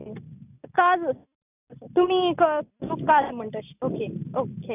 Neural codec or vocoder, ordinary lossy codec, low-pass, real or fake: none; none; 3.6 kHz; real